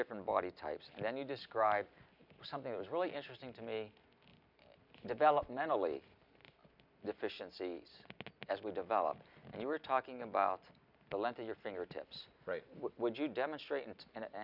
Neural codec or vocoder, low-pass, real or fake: none; 5.4 kHz; real